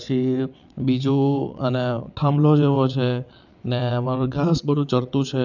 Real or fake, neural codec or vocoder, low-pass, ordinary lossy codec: fake; vocoder, 22.05 kHz, 80 mel bands, WaveNeXt; 7.2 kHz; none